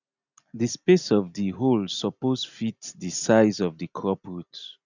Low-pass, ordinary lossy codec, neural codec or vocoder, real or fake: 7.2 kHz; none; none; real